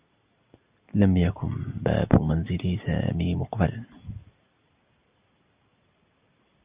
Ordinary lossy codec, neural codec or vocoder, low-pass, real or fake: Opus, 64 kbps; none; 3.6 kHz; real